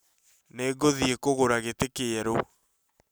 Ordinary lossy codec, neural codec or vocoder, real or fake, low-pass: none; none; real; none